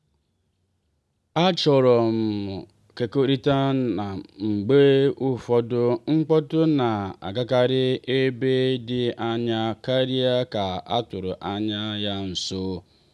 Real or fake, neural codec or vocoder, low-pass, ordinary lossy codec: real; none; none; none